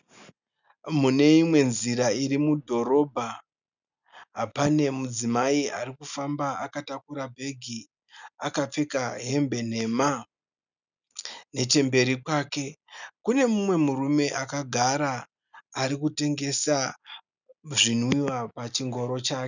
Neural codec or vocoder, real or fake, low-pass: none; real; 7.2 kHz